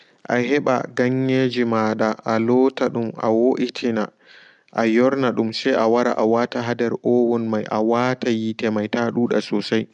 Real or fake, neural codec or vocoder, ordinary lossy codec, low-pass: real; none; none; 10.8 kHz